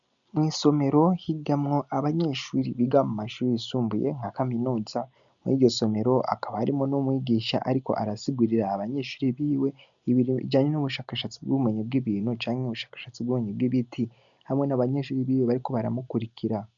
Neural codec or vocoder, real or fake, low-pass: none; real; 7.2 kHz